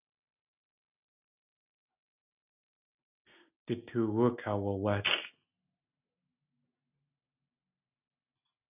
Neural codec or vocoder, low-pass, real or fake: none; 3.6 kHz; real